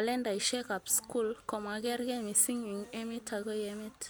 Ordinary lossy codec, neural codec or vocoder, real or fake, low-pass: none; none; real; none